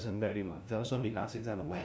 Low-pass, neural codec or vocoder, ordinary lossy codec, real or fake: none; codec, 16 kHz, 0.5 kbps, FunCodec, trained on LibriTTS, 25 frames a second; none; fake